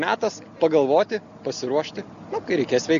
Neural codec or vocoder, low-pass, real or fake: none; 7.2 kHz; real